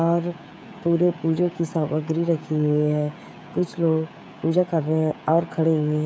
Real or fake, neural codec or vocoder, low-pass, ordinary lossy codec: fake; codec, 16 kHz, 16 kbps, FreqCodec, smaller model; none; none